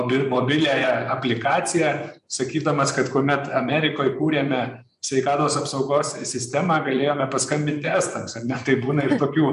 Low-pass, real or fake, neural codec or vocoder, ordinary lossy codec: 10.8 kHz; fake; vocoder, 24 kHz, 100 mel bands, Vocos; AAC, 96 kbps